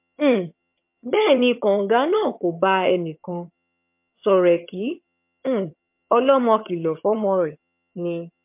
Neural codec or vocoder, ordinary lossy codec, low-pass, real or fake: vocoder, 22.05 kHz, 80 mel bands, HiFi-GAN; MP3, 32 kbps; 3.6 kHz; fake